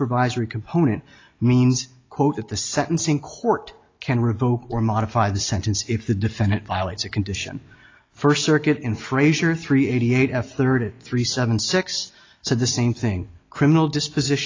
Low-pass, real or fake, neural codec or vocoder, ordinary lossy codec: 7.2 kHz; real; none; AAC, 32 kbps